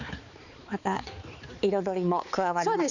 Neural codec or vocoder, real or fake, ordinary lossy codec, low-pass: codec, 16 kHz, 4 kbps, X-Codec, HuBERT features, trained on balanced general audio; fake; none; 7.2 kHz